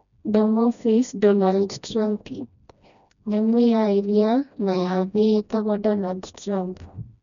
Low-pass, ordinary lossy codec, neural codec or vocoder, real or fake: 7.2 kHz; none; codec, 16 kHz, 1 kbps, FreqCodec, smaller model; fake